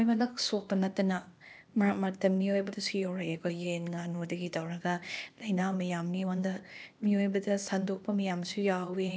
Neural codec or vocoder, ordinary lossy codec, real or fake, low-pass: codec, 16 kHz, 0.8 kbps, ZipCodec; none; fake; none